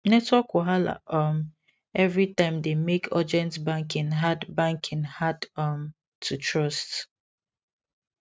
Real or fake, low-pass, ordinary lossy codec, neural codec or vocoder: real; none; none; none